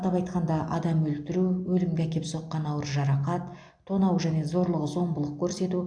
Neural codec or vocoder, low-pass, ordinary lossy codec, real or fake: none; 9.9 kHz; AAC, 64 kbps; real